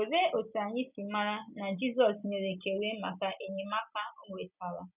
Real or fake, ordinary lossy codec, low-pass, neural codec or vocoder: real; none; 3.6 kHz; none